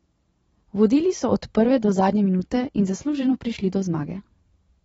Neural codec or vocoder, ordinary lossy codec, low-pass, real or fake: vocoder, 44.1 kHz, 128 mel bands every 512 samples, BigVGAN v2; AAC, 24 kbps; 19.8 kHz; fake